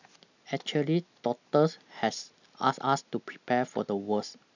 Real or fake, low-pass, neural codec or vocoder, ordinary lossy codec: real; 7.2 kHz; none; none